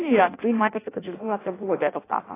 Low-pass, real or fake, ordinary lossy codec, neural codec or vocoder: 3.6 kHz; fake; AAC, 16 kbps; codec, 16 kHz in and 24 kHz out, 0.6 kbps, FireRedTTS-2 codec